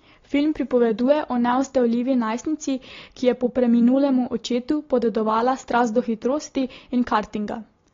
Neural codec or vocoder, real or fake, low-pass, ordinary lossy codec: none; real; 7.2 kHz; AAC, 32 kbps